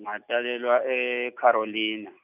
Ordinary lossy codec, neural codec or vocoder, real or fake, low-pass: none; none; real; 3.6 kHz